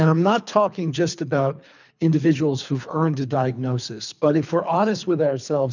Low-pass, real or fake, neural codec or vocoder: 7.2 kHz; fake; codec, 24 kHz, 3 kbps, HILCodec